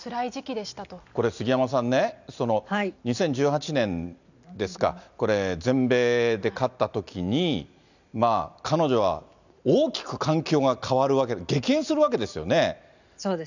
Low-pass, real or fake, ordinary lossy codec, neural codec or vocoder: 7.2 kHz; real; none; none